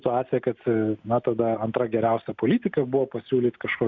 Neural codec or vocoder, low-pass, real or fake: none; 7.2 kHz; real